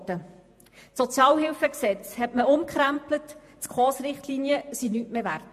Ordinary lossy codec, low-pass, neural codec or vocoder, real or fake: MP3, 64 kbps; 14.4 kHz; vocoder, 48 kHz, 128 mel bands, Vocos; fake